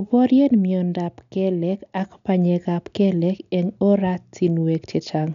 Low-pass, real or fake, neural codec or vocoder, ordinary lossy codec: 7.2 kHz; real; none; none